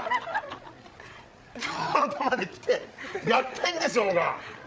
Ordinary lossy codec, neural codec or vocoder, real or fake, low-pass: none; codec, 16 kHz, 8 kbps, FreqCodec, larger model; fake; none